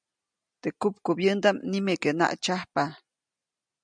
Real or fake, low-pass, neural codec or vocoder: real; 9.9 kHz; none